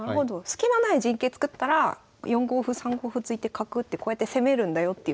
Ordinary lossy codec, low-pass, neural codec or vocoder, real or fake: none; none; none; real